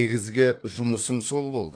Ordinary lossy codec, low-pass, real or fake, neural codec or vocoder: AAC, 48 kbps; 9.9 kHz; fake; codec, 24 kHz, 1 kbps, SNAC